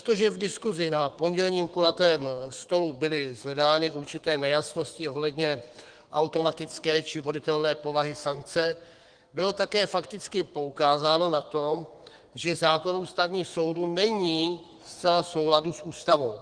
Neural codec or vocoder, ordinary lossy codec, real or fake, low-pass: codec, 32 kHz, 1.9 kbps, SNAC; Opus, 24 kbps; fake; 9.9 kHz